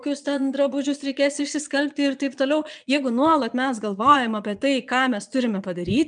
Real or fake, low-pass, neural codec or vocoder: fake; 9.9 kHz; vocoder, 22.05 kHz, 80 mel bands, Vocos